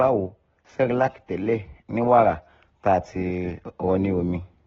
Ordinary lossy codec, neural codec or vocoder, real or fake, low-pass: AAC, 24 kbps; none; real; 7.2 kHz